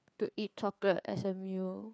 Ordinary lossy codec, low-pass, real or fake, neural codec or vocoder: none; none; fake; codec, 16 kHz, 4 kbps, FreqCodec, larger model